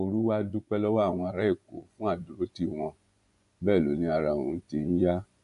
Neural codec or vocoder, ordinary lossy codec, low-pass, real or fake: vocoder, 24 kHz, 100 mel bands, Vocos; AAC, 64 kbps; 10.8 kHz; fake